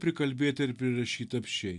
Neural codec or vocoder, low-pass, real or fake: none; 10.8 kHz; real